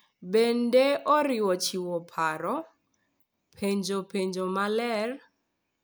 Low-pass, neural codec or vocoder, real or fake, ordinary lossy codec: none; none; real; none